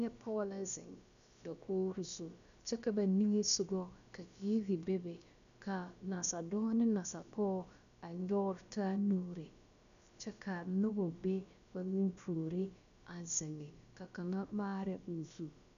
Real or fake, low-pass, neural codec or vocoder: fake; 7.2 kHz; codec, 16 kHz, about 1 kbps, DyCAST, with the encoder's durations